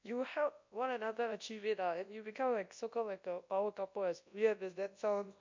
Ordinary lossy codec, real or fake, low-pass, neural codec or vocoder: MP3, 48 kbps; fake; 7.2 kHz; codec, 24 kHz, 0.9 kbps, WavTokenizer, large speech release